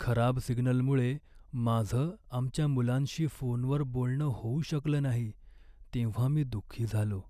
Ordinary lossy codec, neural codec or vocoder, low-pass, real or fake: none; none; 14.4 kHz; real